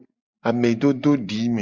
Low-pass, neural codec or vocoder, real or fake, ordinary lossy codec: 7.2 kHz; none; real; Opus, 64 kbps